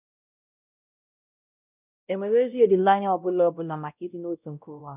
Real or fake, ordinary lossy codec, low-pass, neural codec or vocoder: fake; none; 3.6 kHz; codec, 16 kHz, 0.5 kbps, X-Codec, WavLM features, trained on Multilingual LibriSpeech